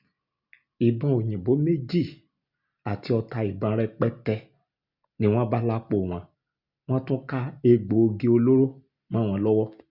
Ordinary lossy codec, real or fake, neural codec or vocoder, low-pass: Opus, 64 kbps; real; none; 5.4 kHz